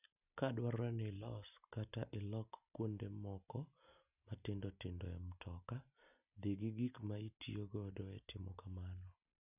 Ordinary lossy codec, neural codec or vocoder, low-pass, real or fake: none; none; 3.6 kHz; real